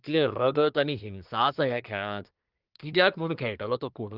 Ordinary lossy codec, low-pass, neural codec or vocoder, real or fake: Opus, 24 kbps; 5.4 kHz; codec, 24 kHz, 1 kbps, SNAC; fake